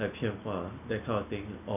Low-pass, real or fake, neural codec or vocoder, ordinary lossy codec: 3.6 kHz; real; none; none